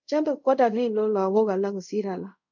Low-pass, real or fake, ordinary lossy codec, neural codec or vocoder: 7.2 kHz; fake; MP3, 64 kbps; codec, 24 kHz, 0.5 kbps, DualCodec